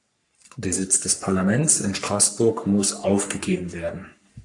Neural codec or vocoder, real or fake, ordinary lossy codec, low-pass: codec, 44.1 kHz, 3.4 kbps, Pupu-Codec; fake; AAC, 64 kbps; 10.8 kHz